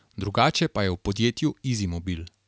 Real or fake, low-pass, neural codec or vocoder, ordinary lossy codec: real; none; none; none